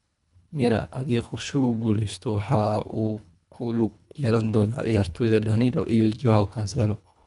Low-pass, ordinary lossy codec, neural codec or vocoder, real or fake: 10.8 kHz; none; codec, 24 kHz, 1.5 kbps, HILCodec; fake